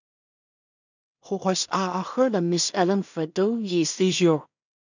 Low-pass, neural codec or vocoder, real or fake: 7.2 kHz; codec, 16 kHz in and 24 kHz out, 0.4 kbps, LongCat-Audio-Codec, two codebook decoder; fake